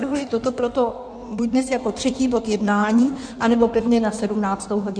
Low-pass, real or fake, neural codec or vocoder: 9.9 kHz; fake; codec, 16 kHz in and 24 kHz out, 1.1 kbps, FireRedTTS-2 codec